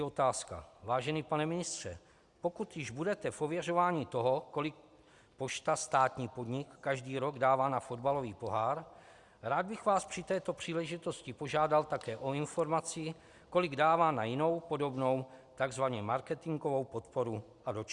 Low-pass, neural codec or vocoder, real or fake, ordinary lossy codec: 10.8 kHz; none; real; Opus, 64 kbps